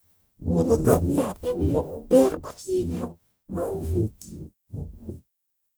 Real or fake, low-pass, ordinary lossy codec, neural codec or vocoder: fake; none; none; codec, 44.1 kHz, 0.9 kbps, DAC